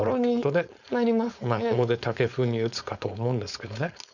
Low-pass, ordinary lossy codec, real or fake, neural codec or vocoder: 7.2 kHz; none; fake; codec, 16 kHz, 4.8 kbps, FACodec